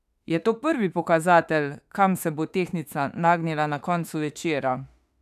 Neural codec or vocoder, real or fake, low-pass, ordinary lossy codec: autoencoder, 48 kHz, 32 numbers a frame, DAC-VAE, trained on Japanese speech; fake; 14.4 kHz; none